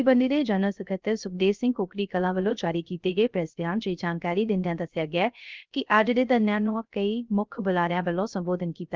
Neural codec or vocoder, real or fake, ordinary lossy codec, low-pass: codec, 16 kHz, 0.3 kbps, FocalCodec; fake; Opus, 32 kbps; 7.2 kHz